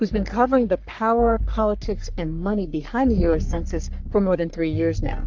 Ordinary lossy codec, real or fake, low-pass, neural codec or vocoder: MP3, 64 kbps; fake; 7.2 kHz; codec, 44.1 kHz, 3.4 kbps, Pupu-Codec